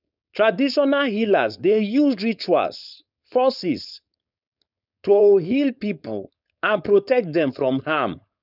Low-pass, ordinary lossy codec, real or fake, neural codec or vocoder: 5.4 kHz; none; fake; codec, 16 kHz, 4.8 kbps, FACodec